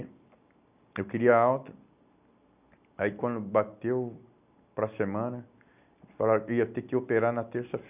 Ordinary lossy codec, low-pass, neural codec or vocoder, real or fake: none; 3.6 kHz; none; real